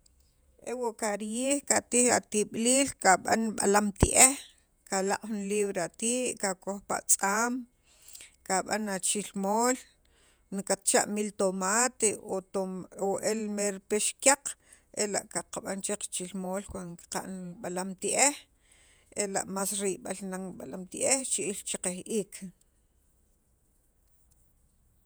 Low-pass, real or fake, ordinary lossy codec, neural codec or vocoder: none; fake; none; vocoder, 48 kHz, 128 mel bands, Vocos